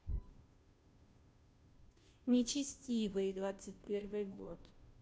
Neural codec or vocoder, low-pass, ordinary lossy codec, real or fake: codec, 16 kHz, 0.5 kbps, FunCodec, trained on Chinese and English, 25 frames a second; none; none; fake